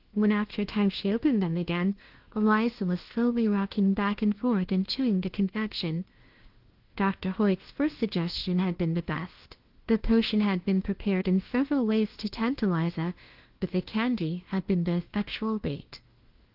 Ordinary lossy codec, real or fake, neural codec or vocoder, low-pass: Opus, 16 kbps; fake; codec, 16 kHz, 1 kbps, FunCodec, trained on LibriTTS, 50 frames a second; 5.4 kHz